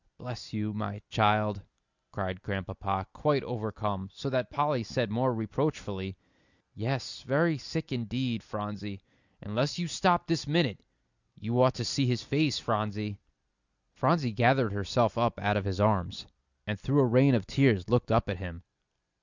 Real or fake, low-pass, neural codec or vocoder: real; 7.2 kHz; none